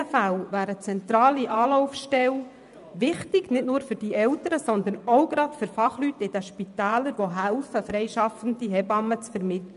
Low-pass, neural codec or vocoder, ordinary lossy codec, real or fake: 10.8 kHz; vocoder, 24 kHz, 100 mel bands, Vocos; none; fake